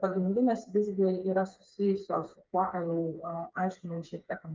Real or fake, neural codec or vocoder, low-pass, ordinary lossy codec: fake; codec, 16 kHz, 4 kbps, FreqCodec, smaller model; 7.2 kHz; Opus, 32 kbps